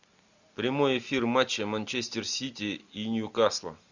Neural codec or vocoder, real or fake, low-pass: none; real; 7.2 kHz